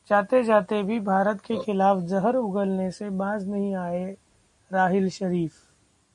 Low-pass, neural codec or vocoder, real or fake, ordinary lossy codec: 10.8 kHz; none; real; MP3, 48 kbps